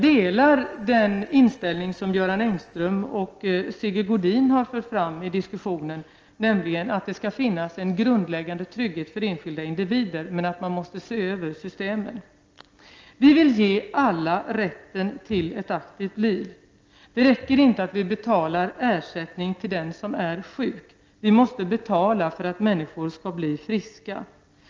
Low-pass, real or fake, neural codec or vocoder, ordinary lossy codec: 7.2 kHz; real; none; Opus, 24 kbps